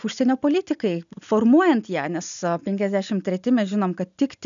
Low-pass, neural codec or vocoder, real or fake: 7.2 kHz; none; real